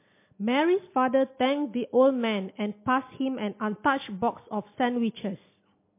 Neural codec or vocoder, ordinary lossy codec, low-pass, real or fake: none; MP3, 24 kbps; 3.6 kHz; real